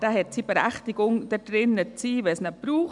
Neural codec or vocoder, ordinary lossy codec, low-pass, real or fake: none; none; 10.8 kHz; real